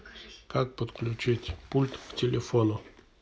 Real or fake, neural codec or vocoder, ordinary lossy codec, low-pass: real; none; none; none